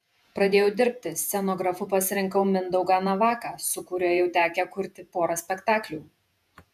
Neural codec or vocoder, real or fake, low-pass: vocoder, 48 kHz, 128 mel bands, Vocos; fake; 14.4 kHz